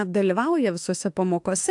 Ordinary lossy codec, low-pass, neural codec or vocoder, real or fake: AAC, 64 kbps; 10.8 kHz; autoencoder, 48 kHz, 32 numbers a frame, DAC-VAE, trained on Japanese speech; fake